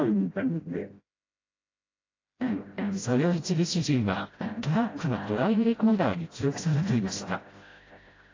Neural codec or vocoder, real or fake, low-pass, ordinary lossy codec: codec, 16 kHz, 0.5 kbps, FreqCodec, smaller model; fake; 7.2 kHz; AAC, 32 kbps